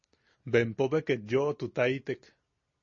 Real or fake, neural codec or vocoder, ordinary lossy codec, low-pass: real; none; MP3, 32 kbps; 7.2 kHz